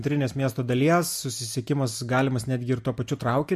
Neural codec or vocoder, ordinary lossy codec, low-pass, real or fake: none; MP3, 64 kbps; 14.4 kHz; real